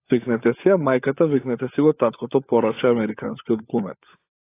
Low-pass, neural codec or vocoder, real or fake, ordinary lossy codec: 3.6 kHz; codec, 16 kHz, 16 kbps, FunCodec, trained on LibriTTS, 50 frames a second; fake; AAC, 24 kbps